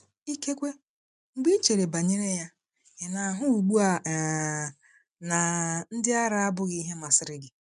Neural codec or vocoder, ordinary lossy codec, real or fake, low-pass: none; MP3, 96 kbps; real; 10.8 kHz